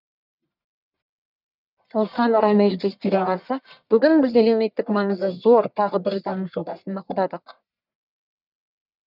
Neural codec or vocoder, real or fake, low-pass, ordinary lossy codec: codec, 44.1 kHz, 1.7 kbps, Pupu-Codec; fake; 5.4 kHz; none